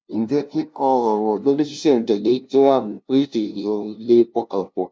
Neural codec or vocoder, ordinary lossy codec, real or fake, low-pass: codec, 16 kHz, 0.5 kbps, FunCodec, trained on LibriTTS, 25 frames a second; none; fake; none